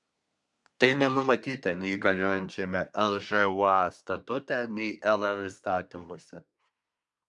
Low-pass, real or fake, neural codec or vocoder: 10.8 kHz; fake; codec, 24 kHz, 1 kbps, SNAC